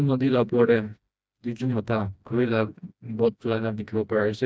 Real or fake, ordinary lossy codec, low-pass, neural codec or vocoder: fake; none; none; codec, 16 kHz, 1 kbps, FreqCodec, smaller model